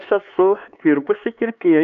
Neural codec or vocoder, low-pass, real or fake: codec, 16 kHz, 4 kbps, X-Codec, HuBERT features, trained on LibriSpeech; 7.2 kHz; fake